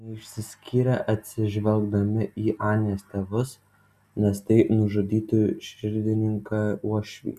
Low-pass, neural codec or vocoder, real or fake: 14.4 kHz; none; real